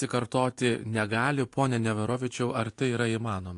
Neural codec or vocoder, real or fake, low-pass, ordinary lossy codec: vocoder, 24 kHz, 100 mel bands, Vocos; fake; 10.8 kHz; AAC, 48 kbps